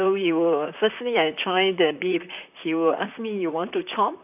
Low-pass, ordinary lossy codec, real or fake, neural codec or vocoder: 3.6 kHz; none; fake; vocoder, 44.1 kHz, 128 mel bands, Pupu-Vocoder